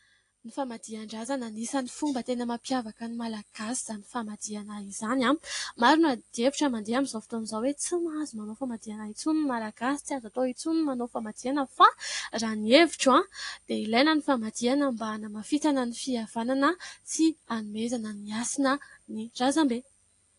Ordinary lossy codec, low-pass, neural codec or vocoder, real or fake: AAC, 48 kbps; 10.8 kHz; none; real